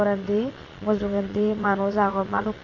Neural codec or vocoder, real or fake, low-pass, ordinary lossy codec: vocoder, 22.05 kHz, 80 mel bands, Vocos; fake; 7.2 kHz; AAC, 32 kbps